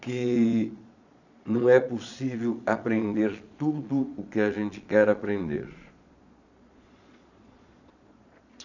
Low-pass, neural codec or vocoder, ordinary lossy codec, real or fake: 7.2 kHz; vocoder, 22.05 kHz, 80 mel bands, Vocos; none; fake